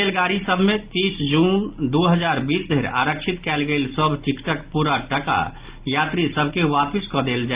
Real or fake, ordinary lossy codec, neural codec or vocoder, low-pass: real; Opus, 32 kbps; none; 3.6 kHz